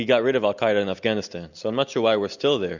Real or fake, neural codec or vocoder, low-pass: real; none; 7.2 kHz